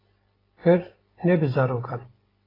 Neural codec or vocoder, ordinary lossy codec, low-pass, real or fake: none; AAC, 24 kbps; 5.4 kHz; real